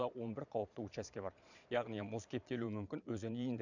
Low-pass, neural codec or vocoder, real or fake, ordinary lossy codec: 7.2 kHz; vocoder, 22.05 kHz, 80 mel bands, Vocos; fake; none